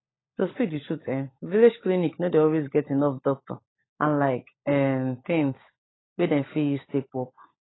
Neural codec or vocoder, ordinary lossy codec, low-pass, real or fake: codec, 16 kHz, 16 kbps, FunCodec, trained on LibriTTS, 50 frames a second; AAC, 16 kbps; 7.2 kHz; fake